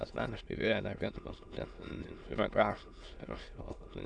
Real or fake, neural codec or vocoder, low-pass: fake; autoencoder, 22.05 kHz, a latent of 192 numbers a frame, VITS, trained on many speakers; 9.9 kHz